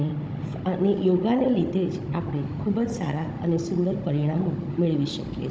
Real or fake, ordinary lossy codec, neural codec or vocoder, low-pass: fake; none; codec, 16 kHz, 16 kbps, FunCodec, trained on Chinese and English, 50 frames a second; none